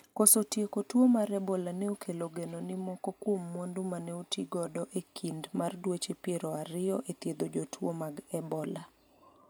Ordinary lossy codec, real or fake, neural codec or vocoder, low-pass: none; real; none; none